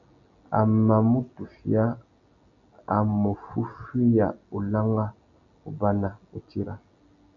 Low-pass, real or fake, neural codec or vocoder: 7.2 kHz; real; none